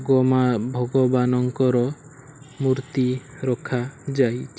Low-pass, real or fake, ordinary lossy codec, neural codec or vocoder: none; real; none; none